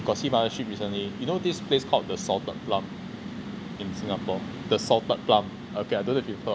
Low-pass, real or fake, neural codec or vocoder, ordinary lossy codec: none; real; none; none